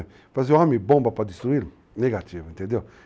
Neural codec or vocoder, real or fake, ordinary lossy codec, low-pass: none; real; none; none